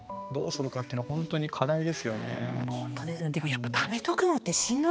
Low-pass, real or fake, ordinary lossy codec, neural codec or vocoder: none; fake; none; codec, 16 kHz, 2 kbps, X-Codec, HuBERT features, trained on balanced general audio